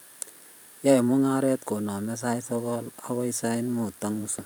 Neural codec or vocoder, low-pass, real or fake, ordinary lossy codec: vocoder, 44.1 kHz, 128 mel bands every 512 samples, BigVGAN v2; none; fake; none